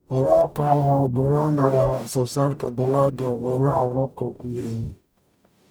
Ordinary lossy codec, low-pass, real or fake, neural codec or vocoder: none; none; fake; codec, 44.1 kHz, 0.9 kbps, DAC